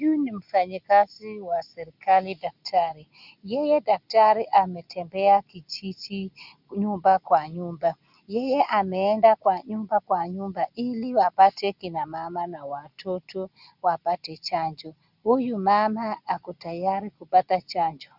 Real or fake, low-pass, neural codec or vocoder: real; 5.4 kHz; none